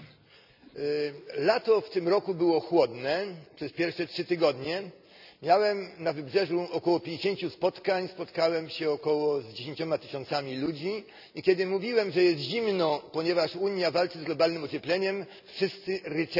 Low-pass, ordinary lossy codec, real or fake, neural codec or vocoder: 5.4 kHz; none; real; none